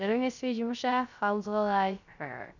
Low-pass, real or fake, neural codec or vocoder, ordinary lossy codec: 7.2 kHz; fake; codec, 16 kHz, 0.3 kbps, FocalCodec; none